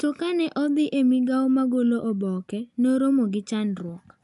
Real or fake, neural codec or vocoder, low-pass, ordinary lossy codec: real; none; 10.8 kHz; none